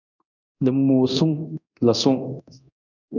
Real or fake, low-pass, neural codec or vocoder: fake; 7.2 kHz; codec, 24 kHz, 0.9 kbps, DualCodec